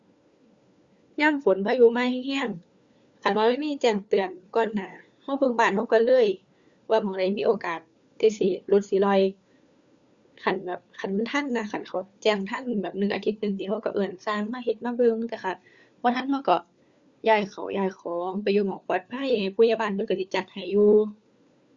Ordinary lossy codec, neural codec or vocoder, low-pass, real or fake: Opus, 64 kbps; codec, 16 kHz, 4 kbps, FunCodec, trained on LibriTTS, 50 frames a second; 7.2 kHz; fake